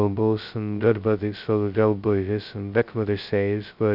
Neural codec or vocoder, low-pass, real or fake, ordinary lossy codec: codec, 16 kHz, 0.2 kbps, FocalCodec; 5.4 kHz; fake; Opus, 64 kbps